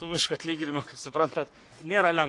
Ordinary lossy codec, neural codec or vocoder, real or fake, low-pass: AAC, 48 kbps; codec, 44.1 kHz, 3.4 kbps, Pupu-Codec; fake; 10.8 kHz